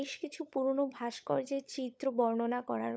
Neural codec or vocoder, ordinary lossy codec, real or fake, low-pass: codec, 16 kHz, 16 kbps, FunCodec, trained on LibriTTS, 50 frames a second; none; fake; none